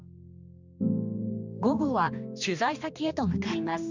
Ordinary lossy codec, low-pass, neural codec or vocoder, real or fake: none; 7.2 kHz; codec, 16 kHz, 2 kbps, X-Codec, HuBERT features, trained on general audio; fake